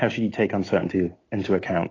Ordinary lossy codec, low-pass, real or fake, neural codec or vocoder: AAC, 32 kbps; 7.2 kHz; real; none